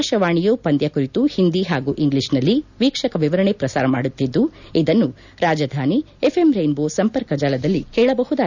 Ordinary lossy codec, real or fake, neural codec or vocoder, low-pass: none; real; none; 7.2 kHz